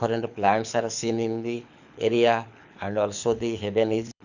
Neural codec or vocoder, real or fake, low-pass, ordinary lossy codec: codec, 24 kHz, 6 kbps, HILCodec; fake; 7.2 kHz; none